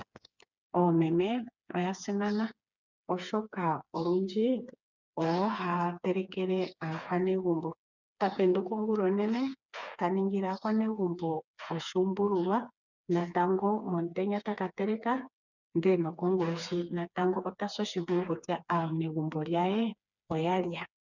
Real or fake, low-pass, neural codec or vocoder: fake; 7.2 kHz; codec, 16 kHz, 4 kbps, FreqCodec, smaller model